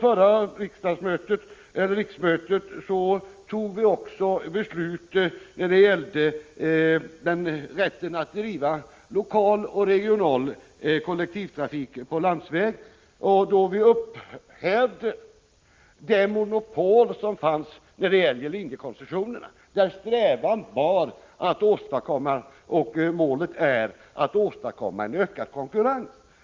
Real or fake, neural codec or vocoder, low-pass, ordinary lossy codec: real; none; 7.2 kHz; Opus, 32 kbps